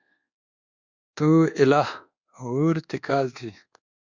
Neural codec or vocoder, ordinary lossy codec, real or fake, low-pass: codec, 24 kHz, 1.2 kbps, DualCodec; Opus, 64 kbps; fake; 7.2 kHz